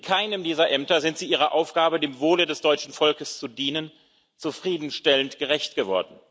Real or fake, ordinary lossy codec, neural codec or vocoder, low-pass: real; none; none; none